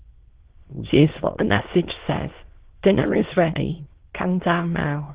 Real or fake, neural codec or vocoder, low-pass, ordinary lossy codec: fake; autoencoder, 22.05 kHz, a latent of 192 numbers a frame, VITS, trained on many speakers; 3.6 kHz; Opus, 16 kbps